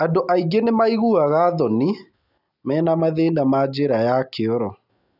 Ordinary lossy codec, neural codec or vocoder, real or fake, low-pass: none; none; real; 5.4 kHz